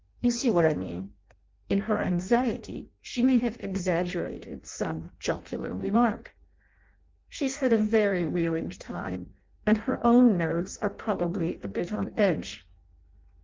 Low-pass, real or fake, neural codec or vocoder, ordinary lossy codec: 7.2 kHz; fake; codec, 16 kHz in and 24 kHz out, 0.6 kbps, FireRedTTS-2 codec; Opus, 24 kbps